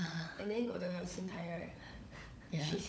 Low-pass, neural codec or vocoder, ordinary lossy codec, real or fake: none; codec, 16 kHz, 16 kbps, FunCodec, trained on LibriTTS, 50 frames a second; none; fake